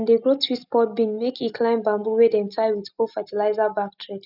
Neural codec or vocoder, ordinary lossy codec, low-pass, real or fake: none; none; 5.4 kHz; real